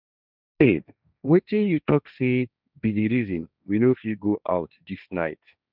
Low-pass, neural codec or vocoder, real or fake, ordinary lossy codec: 5.4 kHz; codec, 16 kHz, 1.1 kbps, Voila-Tokenizer; fake; none